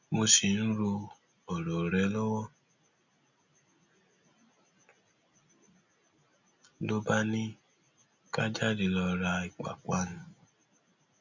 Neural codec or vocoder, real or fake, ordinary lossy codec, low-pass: none; real; none; 7.2 kHz